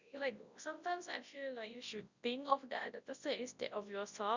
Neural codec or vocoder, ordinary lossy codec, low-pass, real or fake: codec, 24 kHz, 0.9 kbps, WavTokenizer, large speech release; Opus, 64 kbps; 7.2 kHz; fake